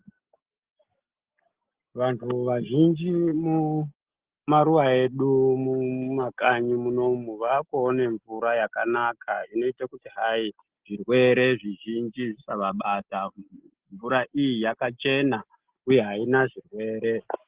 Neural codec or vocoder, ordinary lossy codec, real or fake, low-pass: none; Opus, 16 kbps; real; 3.6 kHz